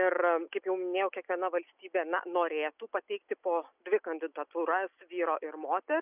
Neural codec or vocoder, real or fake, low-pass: none; real; 3.6 kHz